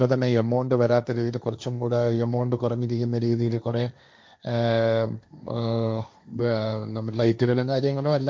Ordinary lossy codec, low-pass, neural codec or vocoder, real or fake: none; none; codec, 16 kHz, 1.1 kbps, Voila-Tokenizer; fake